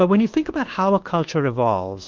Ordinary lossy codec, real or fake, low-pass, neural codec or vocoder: Opus, 16 kbps; fake; 7.2 kHz; codec, 24 kHz, 1.2 kbps, DualCodec